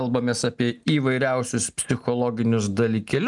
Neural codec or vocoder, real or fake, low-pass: none; real; 10.8 kHz